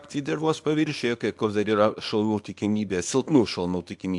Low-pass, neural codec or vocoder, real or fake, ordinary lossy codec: 10.8 kHz; codec, 24 kHz, 0.9 kbps, WavTokenizer, small release; fake; AAC, 64 kbps